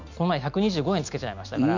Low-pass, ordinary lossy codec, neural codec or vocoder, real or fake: 7.2 kHz; none; none; real